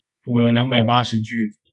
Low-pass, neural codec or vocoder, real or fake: 10.8 kHz; codec, 24 kHz, 0.9 kbps, WavTokenizer, medium music audio release; fake